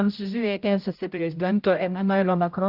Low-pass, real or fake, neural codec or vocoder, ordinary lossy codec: 5.4 kHz; fake; codec, 16 kHz, 0.5 kbps, X-Codec, HuBERT features, trained on general audio; Opus, 24 kbps